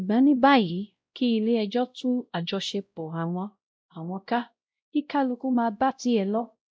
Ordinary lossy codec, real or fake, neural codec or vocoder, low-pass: none; fake; codec, 16 kHz, 0.5 kbps, X-Codec, WavLM features, trained on Multilingual LibriSpeech; none